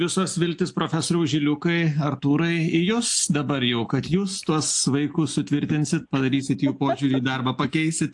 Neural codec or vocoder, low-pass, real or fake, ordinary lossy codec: none; 10.8 kHz; real; AAC, 64 kbps